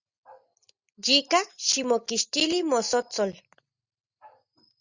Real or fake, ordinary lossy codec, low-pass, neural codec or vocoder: real; Opus, 64 kbps; 7.2 kHz; none